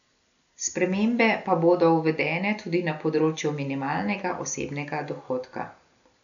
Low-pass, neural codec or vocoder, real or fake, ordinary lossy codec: 7.2 kHz; none; real; none